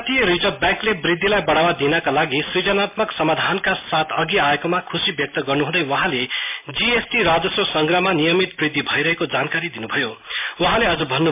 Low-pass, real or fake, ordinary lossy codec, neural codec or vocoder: 3.6 kHz; real; MP3, 32 kbps; none